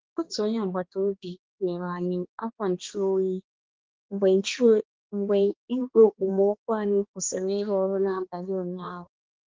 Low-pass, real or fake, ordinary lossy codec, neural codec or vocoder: 7.2 kHz; fake; Opus, 16 kbps; codec, 16 kHz, 2 kbps, X-Codec, HuBERT features, trained on balanced general audio